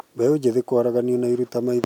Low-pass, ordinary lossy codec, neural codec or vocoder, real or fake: 19.8 kHz; none; none; real